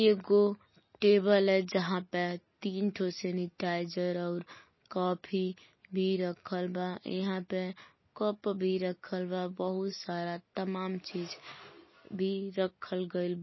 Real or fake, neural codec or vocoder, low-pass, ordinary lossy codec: real; none; 7.2 kHz; MP3, 24 kbps